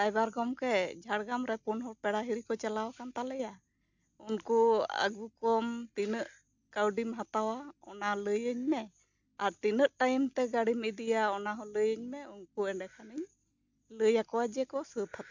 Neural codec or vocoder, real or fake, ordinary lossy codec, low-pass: none; real; none; 7.2 kHz